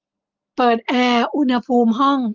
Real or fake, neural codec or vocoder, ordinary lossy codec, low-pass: real; none; Opus, 24 kbps; 7.2 kHz